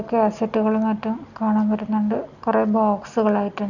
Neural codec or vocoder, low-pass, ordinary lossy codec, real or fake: none; 7.2 kHz; none; real